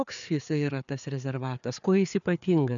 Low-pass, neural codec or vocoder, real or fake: 7.2 kHz; codec, 16 kHz, 4 kbps, FreqCodec, larger model; fake